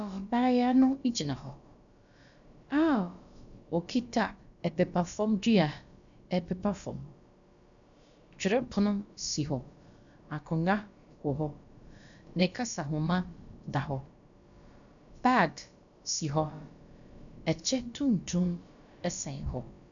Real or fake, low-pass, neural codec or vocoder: fake; 7.2 kHz; codec, 16 kHz, about 1 kbps, DyCAST, with the encoder's durations